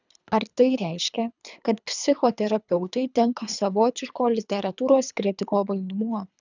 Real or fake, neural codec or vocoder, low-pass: fake; codec, 24 kHz, 3 kbps, HILCodec; 7.2 kHz